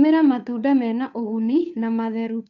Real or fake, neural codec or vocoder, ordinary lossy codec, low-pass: fake; codec, 16 kHz, 2 kbps, FunCodec, trained on Chinese and English, 25 frames a second; none; 7.2 kHz